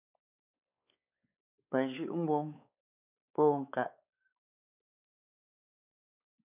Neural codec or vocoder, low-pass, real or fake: codec, 16 kHz, 4 kbps, X-Codec, WavLM features, trained on Multilingual LibriSpeech; 3.6 kHz; fake